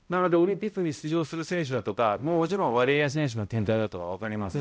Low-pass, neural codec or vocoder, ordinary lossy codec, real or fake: none; codec, 16 kHz, 0.5 kbps, X-Codec, HuBERT features, trained on balanced general audio; none; fake